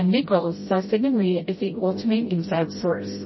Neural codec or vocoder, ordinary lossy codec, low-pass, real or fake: codec, 16 kHz, 0.5 kbps, FreqCodec, smaller model; MP3, 24 kbps; 7.2 kHz; fake